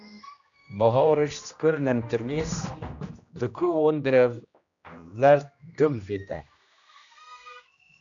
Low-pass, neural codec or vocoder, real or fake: 7.2 kHz; codec, 16 kHz, 1 kbps, X-Codec, HuBERT features, trained on general audio; fake